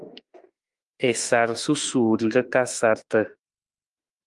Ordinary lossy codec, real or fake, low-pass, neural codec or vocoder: Opus, 32 kbps; fake; 10.8 kHz; autoencoder, 48 kHz, 32 numbers a frame, DAC-VAE, trained on Japanese speech